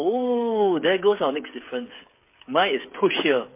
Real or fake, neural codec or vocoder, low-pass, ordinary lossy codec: fake; codec, 16 kHz, 8 kbps, FreqCodec, smaller model; 3.6 kHz; MP3, 24 kbps